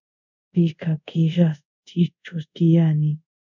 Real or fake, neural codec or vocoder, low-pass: fake; codec, 24 kHz, 0.5 kbps, DualCodec; 7.2 kHz